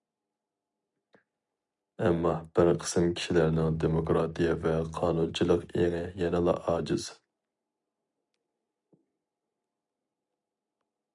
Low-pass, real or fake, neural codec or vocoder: 10.8 kHz; real; none